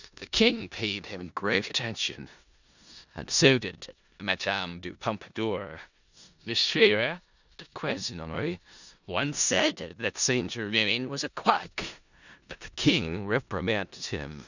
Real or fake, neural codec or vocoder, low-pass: fake; codec, 16 kHz in and 24 kHz out, 0.4 kbps, LongCat-Audio-Codec, four codebook decoder; 7.2 kHz